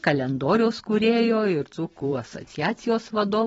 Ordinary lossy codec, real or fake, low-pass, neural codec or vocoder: AAC, 24 kbps; fake; 19.8 kHz; vocoder, 44.1 kHz, 128 mel bands every 512 samples, BigVGAN v2